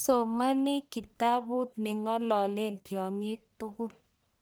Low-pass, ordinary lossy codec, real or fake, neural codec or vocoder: none; none; fake; codec, 44.1 kHz, 1.7 kbps, Pupu-Codec